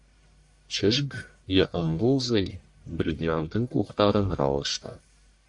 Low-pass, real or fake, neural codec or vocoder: 10.8 kHz; fake; codec, 44.1 kHz, 1.7 kbps, Pupu-Codec